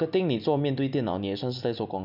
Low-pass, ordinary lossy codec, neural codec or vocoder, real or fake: 5.4 kHz; none; none; real